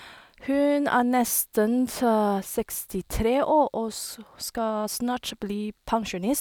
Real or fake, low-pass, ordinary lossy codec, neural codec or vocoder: real; none; none; none